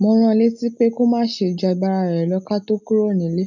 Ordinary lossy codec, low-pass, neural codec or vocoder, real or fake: none; 7.2 kHz; none; real